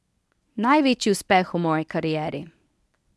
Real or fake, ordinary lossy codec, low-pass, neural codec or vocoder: fake; none; none; codec, 24 kHz, 0.9 kbps, WavTokenizer, medium speech release version 1